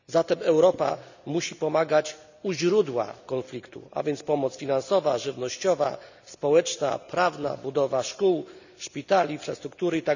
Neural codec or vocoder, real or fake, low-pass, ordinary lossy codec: none; real; 7.2 kHz; none